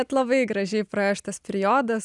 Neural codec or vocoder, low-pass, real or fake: none; 10.8 kHz; real